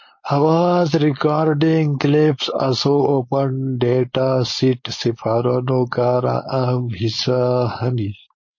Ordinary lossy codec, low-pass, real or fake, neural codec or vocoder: MP3, 32 kbps; 7.2 kHz; fake; codec, 16 kHz, 4.8 kbps, FACodec